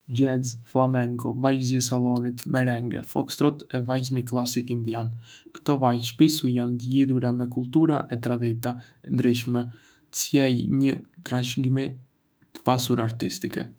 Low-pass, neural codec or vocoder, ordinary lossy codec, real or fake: none; autoencoder, 48 kHz, 32 numbers a frame, DAC-VAE, trained on Japanese speech; none; fake